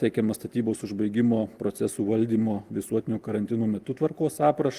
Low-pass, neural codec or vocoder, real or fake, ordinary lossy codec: 14.4 kHz; none; real; Opus, 24 kbps